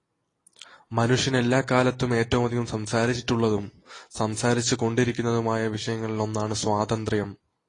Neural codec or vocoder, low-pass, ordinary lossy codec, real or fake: none; 10.8 kHz; AAC, 32 kbps; real